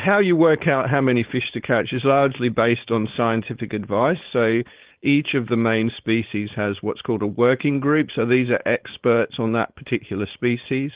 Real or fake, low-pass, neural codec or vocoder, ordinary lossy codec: fake; 3.6 kHz; codec, 16 kHz, 4.8 kbps, FACodec; Opus, 16 kbps